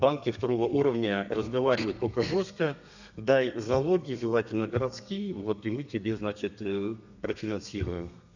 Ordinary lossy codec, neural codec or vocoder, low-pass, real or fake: none; codec, 32 kHz, 1.9 kbps, SNAC; 7.2 kHz; fake